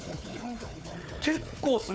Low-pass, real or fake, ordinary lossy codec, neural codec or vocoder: none; fake; none; codec, 16 kHz, 4 kbps, FunCodec, trained on Chinese and English, 50 frames a second